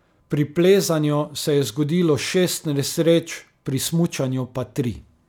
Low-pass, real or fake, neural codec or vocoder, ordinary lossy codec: 19.8 kHz; real; none; none